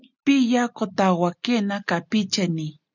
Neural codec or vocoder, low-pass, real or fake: none; 7.2 kHz; real